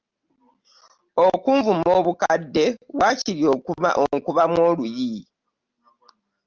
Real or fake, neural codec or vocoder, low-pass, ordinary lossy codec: real; none; 7.2 kHz; Opus, 24 kbps